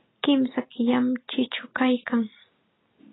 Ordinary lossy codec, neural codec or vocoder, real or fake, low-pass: AAC, 16 kbps; none; real; 7.2 kHz